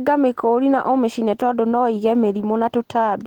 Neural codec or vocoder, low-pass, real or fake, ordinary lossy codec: none; 19.8 kHz; real; Opus, 16 kbps